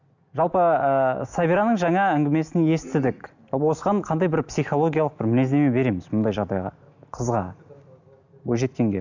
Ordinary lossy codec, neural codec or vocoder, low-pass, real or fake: none; none; 7.2 kHz; real